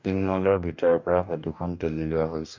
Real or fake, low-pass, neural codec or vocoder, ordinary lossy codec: fake; 7.2 kHz; codec, 44.1 kHz, 2.6 kbps, DAC; MP3, 64 kbps